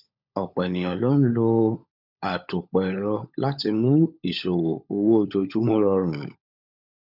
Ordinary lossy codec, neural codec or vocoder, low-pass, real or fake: none; codec, 16 kHz, 16 kbps, FunCodec, trained on LibriTTS, 50 frames a second; 5.4 kHz; fake